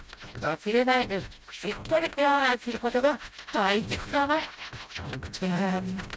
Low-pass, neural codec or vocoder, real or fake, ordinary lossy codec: none; codec, 16 kHz, 0.5 kbps, FreqCodec, smaller model; fake; none